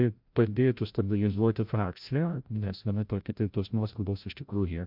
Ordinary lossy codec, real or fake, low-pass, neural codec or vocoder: MP3, 48 kbps; fake; 5.4 kHz; codec, 16 kHz, 0.5 kbps, FreqCodec, larger model